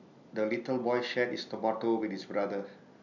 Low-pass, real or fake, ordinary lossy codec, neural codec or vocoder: 7.2 kHz; real; none; none